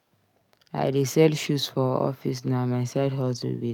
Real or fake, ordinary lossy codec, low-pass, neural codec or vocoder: fake; none; 19.8 kHz; codec, 44.1 kHz, 7.8 kbps, DAC